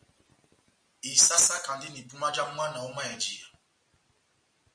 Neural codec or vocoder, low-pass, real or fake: none; 9.9 kHz; real